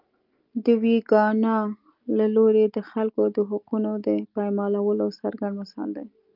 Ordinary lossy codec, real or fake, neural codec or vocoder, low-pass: Opus, 24 kbps; real; none; 5.4 kHz